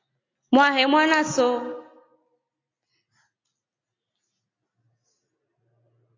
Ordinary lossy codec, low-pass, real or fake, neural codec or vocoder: AAC, 48 kbps; 7.2 kHz; real; none